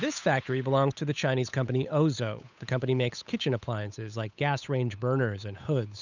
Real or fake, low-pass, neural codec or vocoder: fake; 7.2 kHz; codec, 16 kHz, 8 kbps, FunCodec, trained on Chinese and English, 25 frames a second